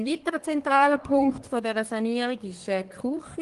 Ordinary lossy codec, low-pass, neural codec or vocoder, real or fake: Opus, 24 kbps; 10.8 kHz; codec, 24 kHz, 1 kbps, SNAC; fake